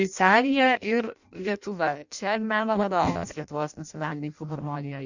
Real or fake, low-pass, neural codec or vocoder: fake; 7.2 kHz; codec, 16 kHz in and 24 kHz out, 0.6 kbps, FireRedTTS-2 codec